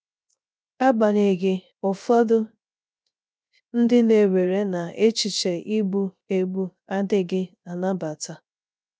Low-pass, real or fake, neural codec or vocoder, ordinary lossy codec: none; fake; codec, 16 kHz, 0.3 kbps, FocalCodec; none